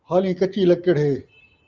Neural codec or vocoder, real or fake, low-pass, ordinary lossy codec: none; real; 7.2 kHz; Opus, 24 kbps